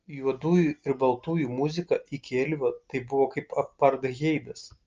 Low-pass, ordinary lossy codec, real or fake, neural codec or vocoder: 7.2 kHz; Opus, 24 kbps; real; none